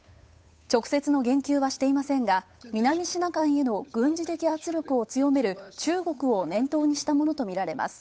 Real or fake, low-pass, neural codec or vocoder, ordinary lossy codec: fake; none; codec, 16 kHz, 8 kbps, FunCodec, trained on Chinese and English, 25 frames a second; none